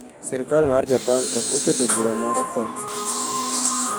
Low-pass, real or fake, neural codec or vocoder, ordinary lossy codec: none; fake; codec, 44.1 kHz, 2.6 kbps, DAC; none